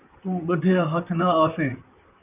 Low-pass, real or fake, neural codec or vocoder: 3.6 kHz; fake; vocoder, 44.1 kHz, 128 mel bands, Pupu-Vocoder